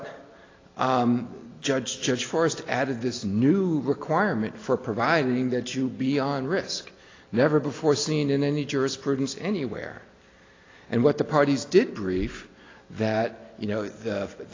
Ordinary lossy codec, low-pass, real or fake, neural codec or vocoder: AAC, 32 kbps; 7.2 kHz; real; none